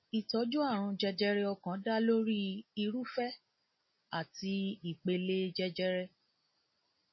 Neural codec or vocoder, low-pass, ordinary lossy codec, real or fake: none; 7.2 kHz; MP3, 24 kbps; real